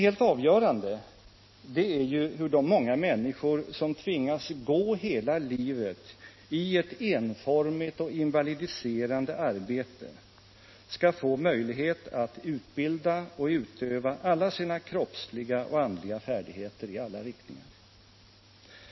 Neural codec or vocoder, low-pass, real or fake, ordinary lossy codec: none; 7.2 kHz; real; MP3, 24 kbps